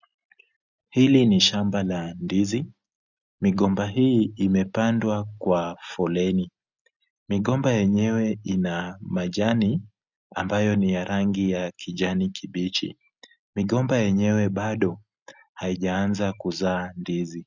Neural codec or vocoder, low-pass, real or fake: none; 7.2 kHz; real